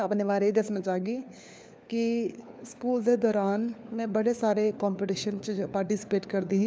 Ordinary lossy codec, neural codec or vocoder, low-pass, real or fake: none; codec, 16 kHz, 4 kbps, FunCodec, trained on LibriTTS, 50 frames a second; none; fake